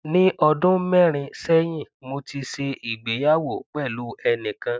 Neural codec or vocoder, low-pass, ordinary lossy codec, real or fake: none; none; none; real